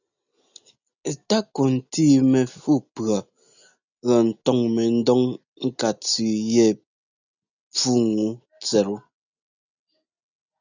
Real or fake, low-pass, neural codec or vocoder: real; 7.2 kHz; none